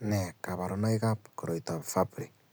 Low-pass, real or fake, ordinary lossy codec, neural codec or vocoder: none; real; none; none